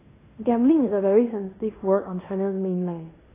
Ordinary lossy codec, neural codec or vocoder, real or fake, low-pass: AAC, 16 kbps; codec, 16 kHz in and 24 kHz out, 0.9 kbps, LongCat-Audio-Codec, fine tuned four codebook decoder; fake; 3.6 kHz